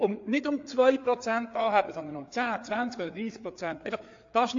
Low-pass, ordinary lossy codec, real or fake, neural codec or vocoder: 7.2 kHz; AAC, 48 kbps; fake; codec, 16 kHz, 4 kbps, FreqCodec, larger model